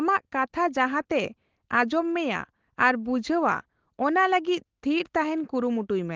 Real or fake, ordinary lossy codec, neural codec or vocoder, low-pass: real; Opus, 16 kbps; none; 7.2 kHz